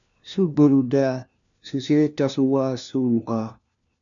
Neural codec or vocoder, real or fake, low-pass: codec, 16 kHz, 1 kbps, FunCodec, trained on LibriTTS, 50 frames a second; fake; 7.2 kHz